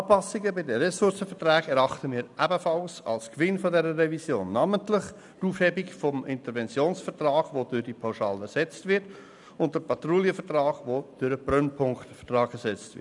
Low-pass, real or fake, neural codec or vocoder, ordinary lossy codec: 10.8 kHz; real; none; none